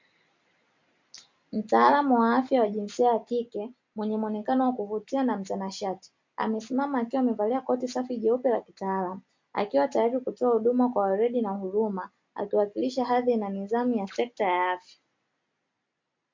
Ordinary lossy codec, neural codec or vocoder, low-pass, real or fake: MP3, 48 kbps; none; 7.2 kHz; real